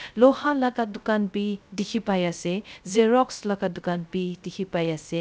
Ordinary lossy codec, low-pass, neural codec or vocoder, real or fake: none; none; codec, 16 kHz, 0.3 kbps, FocalCodec; fake